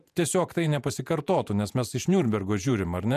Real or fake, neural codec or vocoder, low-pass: fake; vocoder, 48 kHz, 128 mel bands, Vocos; 14.4 kHz